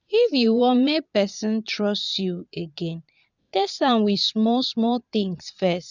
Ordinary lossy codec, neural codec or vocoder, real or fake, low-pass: none; vocoder, 22.05 kHz, 80 mel bands, Vocos; fake; 7.2 kHz